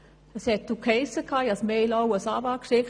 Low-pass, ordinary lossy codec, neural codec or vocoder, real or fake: 9.9 kHz; none; none; real